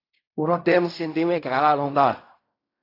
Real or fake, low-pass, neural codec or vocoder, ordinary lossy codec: fake; 5.4 kHz; codec, 16 kHz in and 24 kHz out, 0.4 kbps, LongCat-Audio-Codec, fine tuned four codebook decoder; AAC, 32 kbps